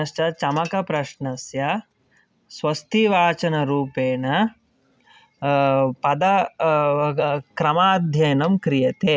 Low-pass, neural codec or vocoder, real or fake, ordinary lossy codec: none; none; real; none